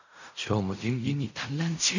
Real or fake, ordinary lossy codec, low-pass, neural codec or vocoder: fake; none; 7.2 kHz; codec, 16 kHz in and 24 kHz out, 0.4 kbps, LongCat-Audio-Codec, fine tuned four codebook decoder